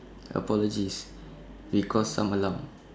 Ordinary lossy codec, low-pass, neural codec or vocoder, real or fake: none; none; none; real